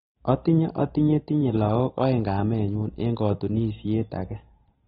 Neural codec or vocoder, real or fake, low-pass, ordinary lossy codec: none; real; 7.2 kHz; AAC, 16 kbps